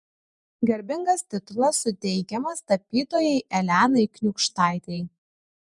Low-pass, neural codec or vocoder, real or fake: 10.8 kHz; vocoder, 48 kHz, 128 mel bands, Vocos; fake